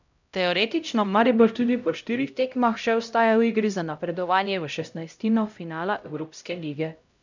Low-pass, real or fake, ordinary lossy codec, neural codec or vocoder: 7.2 kHz; fake; none; codec, 16 kHz, 0.5 kbps, X-Codec, HuBERT features, trained on LibriSpeech